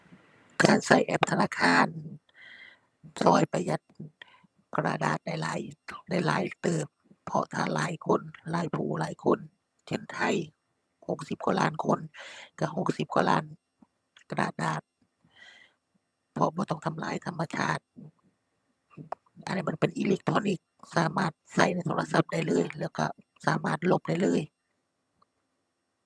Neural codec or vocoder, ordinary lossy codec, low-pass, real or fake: vocoder, 22.05 kHz, 80 mel bands, HiFi-GAN; none; none; fake